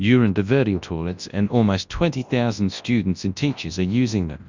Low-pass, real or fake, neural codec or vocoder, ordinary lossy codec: 7.2 kHz; fake; codec, 24 kHz, 0.9 kbps, WavTokenizer, large speech release; Opus, 64 kbps